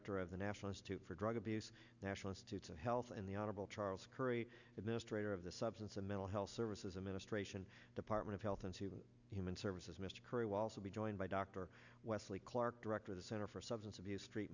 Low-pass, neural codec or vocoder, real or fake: 7.2 kHz; none; real